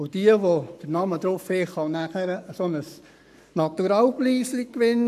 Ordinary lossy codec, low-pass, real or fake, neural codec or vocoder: none; 14.4 kHz; fake; codec, 44.1 kHz, 7.8 kbps, Pupu-Codec